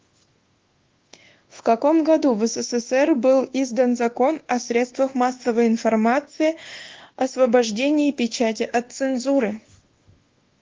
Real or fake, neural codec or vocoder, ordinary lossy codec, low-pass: fake; codec, 24 kHz, 1.2 kbps, DualCodec; Opus, 16 kbps; 7.2 kHz